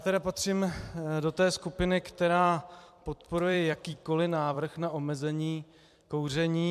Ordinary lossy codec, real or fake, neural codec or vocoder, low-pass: MP3, 96 kbps; fake; vocoder, 44.1 kHz, 128 mel bands every 256 samples, BigVGAN v2; 14.4 kHz